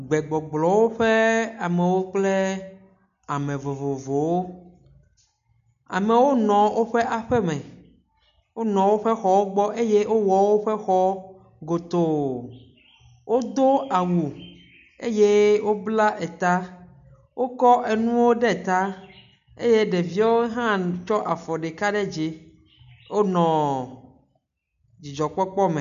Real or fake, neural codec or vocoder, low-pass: real; none; 7.2 kHz